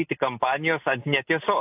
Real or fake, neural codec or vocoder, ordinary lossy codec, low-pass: real; none; AAC, 32 kbps; 3.6 kHz